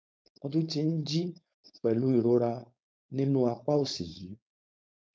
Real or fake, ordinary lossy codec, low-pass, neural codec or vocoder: fake; none; none; codec, 16 kHz, 4.8 kbps, FACodec